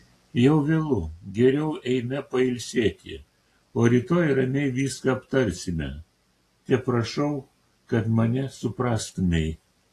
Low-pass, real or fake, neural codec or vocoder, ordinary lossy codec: 14.4 kHz; real; none; AAC, 48 kbps